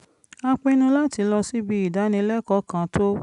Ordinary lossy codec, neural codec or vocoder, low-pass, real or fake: none; none; 10.8 kHz; real